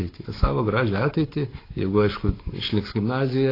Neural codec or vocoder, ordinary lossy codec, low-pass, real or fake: codec, 44.1 kHz, 7.8 kbps, Pupu-Codec; AAC, 24 kbps; 5.4 kHz; fake